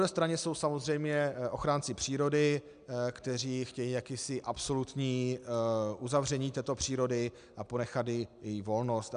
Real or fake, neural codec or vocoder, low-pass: real; none; 9.9 kHz